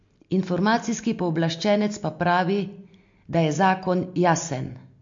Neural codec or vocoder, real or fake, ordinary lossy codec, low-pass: none; real; MP3, 48 kbps; 7.2 kHz